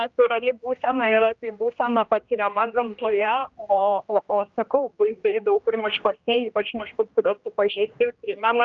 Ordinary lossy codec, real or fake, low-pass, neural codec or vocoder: Opus, 24 kbps; fake; 7.2 kHz; codec, 16 kHz, 1 kbps, X-Codec, HuBERT features, trained on general audio